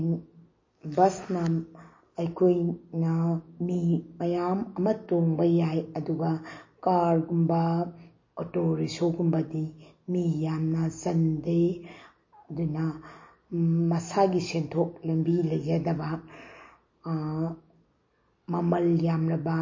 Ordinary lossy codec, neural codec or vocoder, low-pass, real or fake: MP3, 32 kbps; none; 7.2 kHz; real